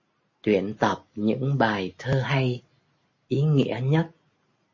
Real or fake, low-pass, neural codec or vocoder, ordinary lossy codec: real; 7.2 kHz; none; MP3, 32 kbps